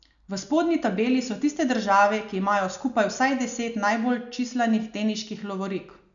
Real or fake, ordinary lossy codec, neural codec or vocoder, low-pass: real; none; none; 7.2 kHz